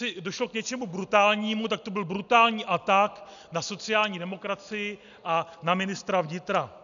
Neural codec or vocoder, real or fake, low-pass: none; real; 7.2 kHz